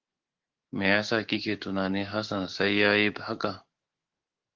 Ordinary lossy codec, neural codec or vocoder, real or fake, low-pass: Opus, 16 kbps; autoencoder, 48 kHz, 128 numbers a frame, DAC-VAE, trained on Japanese speech; fake; 7.2 kHz